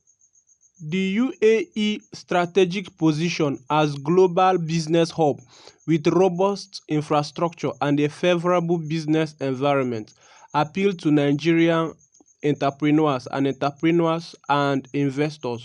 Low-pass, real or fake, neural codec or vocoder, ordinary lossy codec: 10.8 kHz; real; none; none